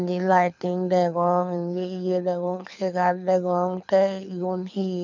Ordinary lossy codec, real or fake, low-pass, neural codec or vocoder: none; fake; 7.2 kHz; codec, 24 kHz, 6 kbps, HILCodec